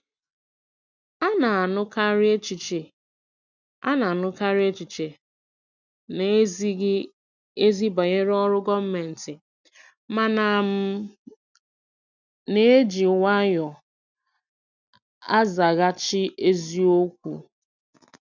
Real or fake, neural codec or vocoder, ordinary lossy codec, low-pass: real; none; none; 7.2 kHz